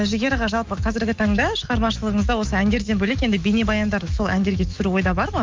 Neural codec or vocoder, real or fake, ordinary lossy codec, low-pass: autoencoder, 48 kHz, 128 numbers a frame, DAC-VAE, trained on Japanese speech; fake; Opus, 24 kbps; 7.2 kHz